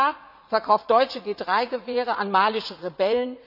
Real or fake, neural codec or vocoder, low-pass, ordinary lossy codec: fake; vocoder, 44.1 kHz, 80 mel bands, Vocos; 5.4 kHz; none